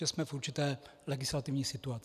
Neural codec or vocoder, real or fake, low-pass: none; real; 14.4 kHz